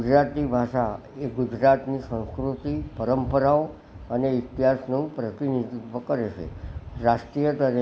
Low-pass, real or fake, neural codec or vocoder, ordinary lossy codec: none; real; none; none